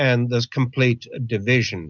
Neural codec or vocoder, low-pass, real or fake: none; 7.2 kHz; real